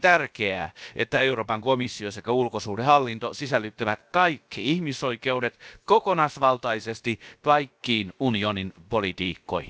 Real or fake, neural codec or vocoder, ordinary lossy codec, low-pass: fake; codec, 16 kHz, about 1 kbps, DyCAST, with the encoder's durations; none; none